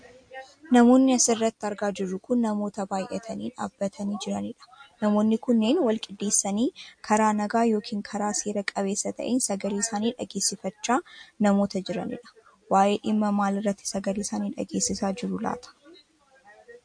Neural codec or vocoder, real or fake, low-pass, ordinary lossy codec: none; real; 9.9 kHz; MP3, 48 kbps